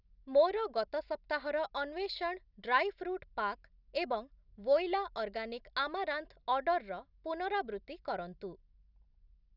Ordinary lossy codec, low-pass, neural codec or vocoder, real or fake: none; 5.4 kHz; none; real